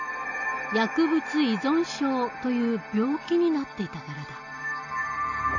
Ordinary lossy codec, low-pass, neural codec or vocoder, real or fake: none; 7.2 kHz; none; real